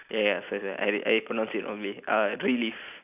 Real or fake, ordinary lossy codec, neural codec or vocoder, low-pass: real; none; none; 3.6 kHz